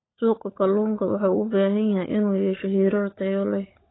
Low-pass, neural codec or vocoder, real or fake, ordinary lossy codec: 7.2 kHz; codec, 16 kHz, 16 kbps, FunCodec, trained on LibriTTS, 50 frames a second; fake; AAC, 16 kbps